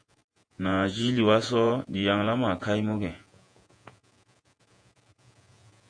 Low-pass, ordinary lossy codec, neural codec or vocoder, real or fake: 9.9 kHz; AAC, 64 kbps; vocoder, 48 kHz, 128 mel bands, Vocos; fake